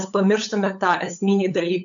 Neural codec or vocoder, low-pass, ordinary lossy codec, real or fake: codec, 16 kHz, 8 kbps, FunCodec, trained on LibriTTS, 25 frames a second; 7.2 kHz; AAC, 64 kbps; fake